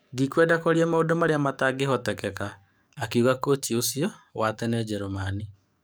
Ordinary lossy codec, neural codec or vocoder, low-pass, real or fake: none; codec, 44.1 kHz, 7.8 kbps, DAC; none; fake